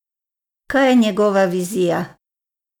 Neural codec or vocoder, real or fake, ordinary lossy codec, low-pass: none; real; none; 19.8 kHz